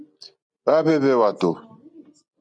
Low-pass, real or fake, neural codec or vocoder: 9.9 kHz; real; none